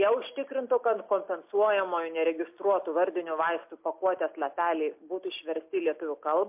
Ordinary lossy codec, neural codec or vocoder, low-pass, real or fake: MP3, 32 kbps; none; 3.6 kHz; real